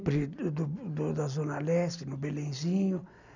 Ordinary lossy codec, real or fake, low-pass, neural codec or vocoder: none; real; 7.2 kHz; none